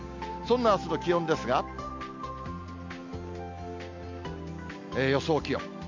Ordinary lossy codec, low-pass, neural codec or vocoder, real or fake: MP3, 48 kbps; 7.2 kHz; none; real